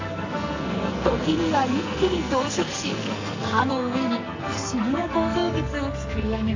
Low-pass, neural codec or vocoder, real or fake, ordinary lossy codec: 7.2 kHz; codec, 44.1 kHz, 2.6 kbps, SNAC; fake; AAC, 48 kbps